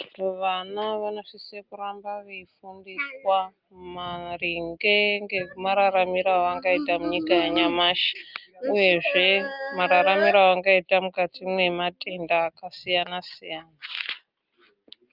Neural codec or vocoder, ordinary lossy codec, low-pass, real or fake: none; Opus, 24 kbps; 5.4 kHz; real